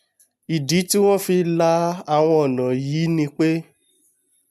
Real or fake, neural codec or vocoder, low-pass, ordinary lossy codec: real; none; 14.4 kHz; MP3, 96 kbps